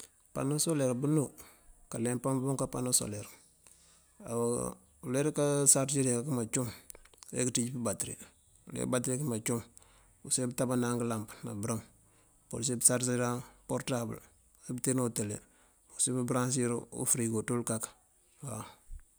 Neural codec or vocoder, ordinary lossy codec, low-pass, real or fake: none; none; none; real